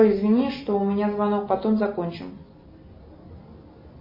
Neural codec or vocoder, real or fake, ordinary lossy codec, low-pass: none; real; MP3, 24 kbps; 5.4 kHz